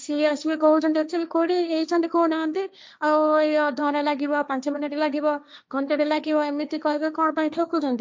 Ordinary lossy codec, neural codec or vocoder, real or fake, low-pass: none; codec, 16 kHz, 1.1 kbps, Voila-Tokenizer; fake; none